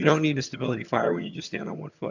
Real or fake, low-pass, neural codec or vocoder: fake; 7.2 kHz; vocoder, 22.05 kHz, 80 mel bands, HiFi-GAN